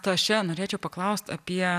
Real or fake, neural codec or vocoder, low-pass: real; none; 14.4 kHz